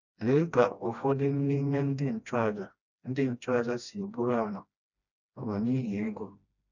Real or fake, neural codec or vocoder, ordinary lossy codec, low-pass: fake; codec, 16 kHz, 1 kbps, FreqCodec, smaller model; none; 7.2 kHz